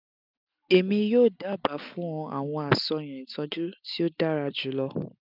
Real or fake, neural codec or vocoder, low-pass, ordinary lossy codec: real; none; 5.4 kHz; none